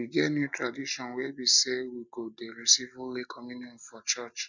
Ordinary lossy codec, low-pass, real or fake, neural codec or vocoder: none; 7.2 kHz; real; none